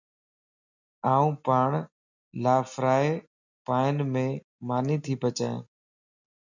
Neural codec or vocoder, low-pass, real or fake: none; 7.2 kHz; real